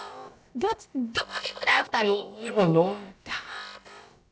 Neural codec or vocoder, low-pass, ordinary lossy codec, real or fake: codec, 16 kHz, about 1 kbps, DyCAST, with the encoder's durations; none; none; fake